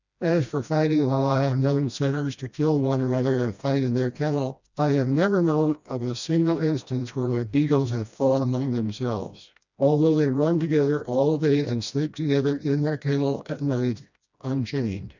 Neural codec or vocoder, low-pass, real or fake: codec, 16 kHz, 1 kbps, FreqCodec, smaller model; 7.2 kHz; fake